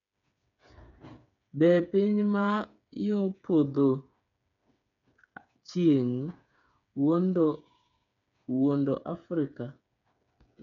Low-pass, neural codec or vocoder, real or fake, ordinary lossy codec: 7.2 kHz; codec, 16 kHz, 8 kbps, FreqCodec, smaller model; fake; none